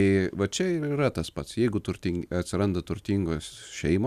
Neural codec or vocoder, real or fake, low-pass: none; real; 14.4 kHz